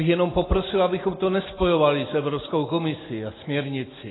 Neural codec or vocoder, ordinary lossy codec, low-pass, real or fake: none; AAC, 16 kbps; 7.2 kHz; real